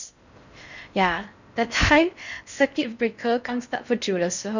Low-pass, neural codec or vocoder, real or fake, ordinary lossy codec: 7.2 kHz; codec, 16 kHz in and 24 kHz out, 0.6 kbps, FocalCodec, streaming, 4096 codes; fake; none